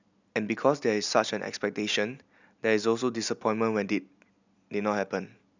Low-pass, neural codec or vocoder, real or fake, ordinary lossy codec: 7.2 kHz; none; real; none